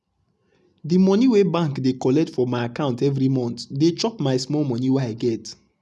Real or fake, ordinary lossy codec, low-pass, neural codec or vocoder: real; none; none; none